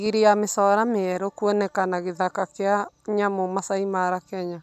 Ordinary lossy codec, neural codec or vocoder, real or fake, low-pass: none; none; real; 14.4 kHz